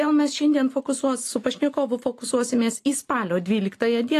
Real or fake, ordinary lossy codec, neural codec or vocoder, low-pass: real; AAC, 48 kbps; none; 14.4 kHz